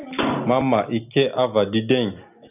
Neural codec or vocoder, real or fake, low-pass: none; real; 3.6 kHz